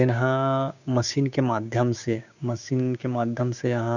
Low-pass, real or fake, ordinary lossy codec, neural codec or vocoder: 7.2 kHz; fake; none; codec, 16 kHz, 6 kbps, DAC